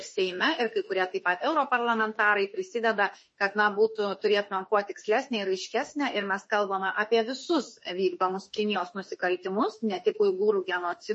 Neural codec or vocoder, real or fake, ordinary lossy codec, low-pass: autoencoder, 48 kHz, 32 numbers a frame, DAC-VAE, trained on Japanese speech; fake; MP3, 32 kbps; 10.8 kHz